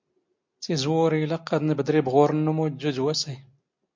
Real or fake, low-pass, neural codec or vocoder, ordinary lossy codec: real; 7.2 kHz; none; MP3, 48 kbps